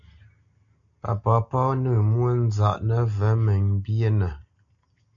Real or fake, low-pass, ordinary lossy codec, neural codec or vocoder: real; 7.2 kHz; MP3, 96 kbps; none